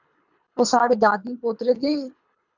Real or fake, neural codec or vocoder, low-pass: fake; codec, 24 kHz, 3 kbps, HILCodec; 7.2 kHz